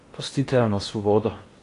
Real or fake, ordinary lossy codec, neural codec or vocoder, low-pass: fake; AAC, 48 kbps; codec, 16 kHz in and 24 kHz out, 0.8 kbps, FocalCodec, streaming, 65536 codes; 10.8 kHz